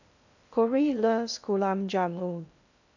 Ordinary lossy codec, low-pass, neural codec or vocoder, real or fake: none; 7.2 kHz; codec, 16 kHz in and 24 kHz out, 0.6 kbps, FocalCodec, streaming, 2048 codes; fake